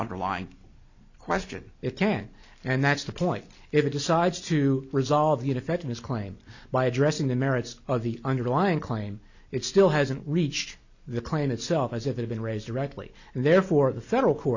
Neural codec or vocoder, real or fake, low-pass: none; real; 7.2 kHz